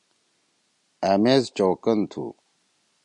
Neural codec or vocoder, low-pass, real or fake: none; 10.8 kHz; real